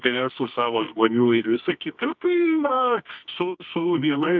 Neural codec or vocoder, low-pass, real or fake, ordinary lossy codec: codec, 24 kHz, 0.9 kbps, WavTokenizer, medium music audio release; 7.2 kHz; fake; MP3, 64 kbps